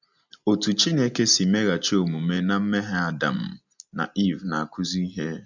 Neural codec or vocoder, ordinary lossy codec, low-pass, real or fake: none; none; 7.2 kHz; real